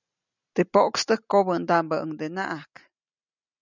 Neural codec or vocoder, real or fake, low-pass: none; real; 7.2 kHz